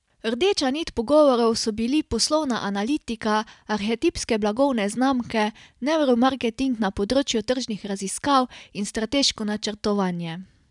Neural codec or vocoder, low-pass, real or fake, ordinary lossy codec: none; 10.8 kHz; real; none